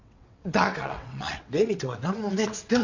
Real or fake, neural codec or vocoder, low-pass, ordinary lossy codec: fake; vocoder, 22.05 kHz, 80 mel bands, WaveNeXt; 7.2 kHz; none